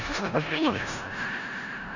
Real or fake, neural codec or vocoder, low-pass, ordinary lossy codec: fake; codec, 16 kHz in and 24 kHz out, 0.4 kbps, LongCat-Audio-Codec, four codebook decoder; 7.2 kHz; none